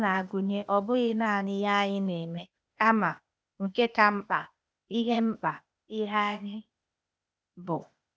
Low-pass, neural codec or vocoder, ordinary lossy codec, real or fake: none; codec, 16 kHz, 0.8 kbps, ZipCodec; none; fake